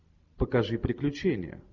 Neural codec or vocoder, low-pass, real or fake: none; 7.2 kHz; real